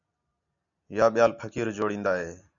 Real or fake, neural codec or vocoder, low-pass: real; none; 7.2 kHz